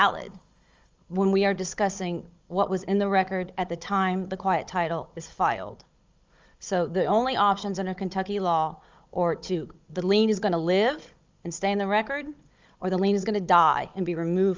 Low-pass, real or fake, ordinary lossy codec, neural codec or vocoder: 7.2 kHz; fake; Opus, 32 kbps; codec, 16 kHz, 16 kbps, FunCodec, trained on Chinese and English, 50 frames a second